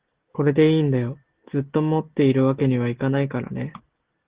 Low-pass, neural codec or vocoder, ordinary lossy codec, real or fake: 3.6 kHz; none; Opus, 16 kbps; real